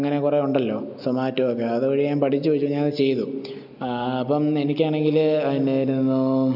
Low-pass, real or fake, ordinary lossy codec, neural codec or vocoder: 5.4 kHz; real; none; none